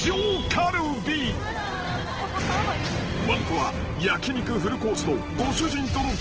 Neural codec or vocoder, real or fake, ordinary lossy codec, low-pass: none; real; Opus, 16 kbps; 7.2 kHz